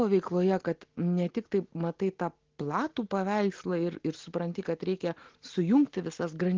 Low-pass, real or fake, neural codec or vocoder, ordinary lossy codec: 7.2 kHz; real; none; Opus, 16 kbps